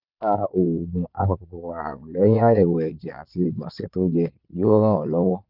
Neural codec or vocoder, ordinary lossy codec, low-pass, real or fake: vocoder, 22.05 kHz, 80 mel bands, Vocos; none; 5.4 kHz; fake